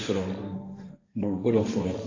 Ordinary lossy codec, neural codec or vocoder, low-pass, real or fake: AAC, 48 kbps; codec, 16 kHz, 1.1 kbps, Voila-Tokenizer; 7.2 kHz; fake